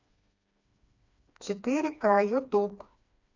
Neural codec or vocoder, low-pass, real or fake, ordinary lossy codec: codec, 16 kHz, 2 kbps, FreqCodec, smaller model; 7.2 kHz; fake; none